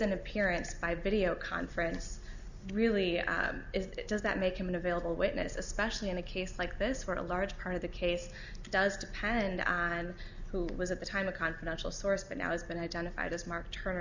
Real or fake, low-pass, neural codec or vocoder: real; 7.2 kHz; none